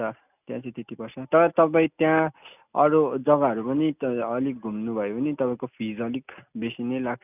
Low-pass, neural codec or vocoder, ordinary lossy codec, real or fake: 3.6 kHz; none; none; real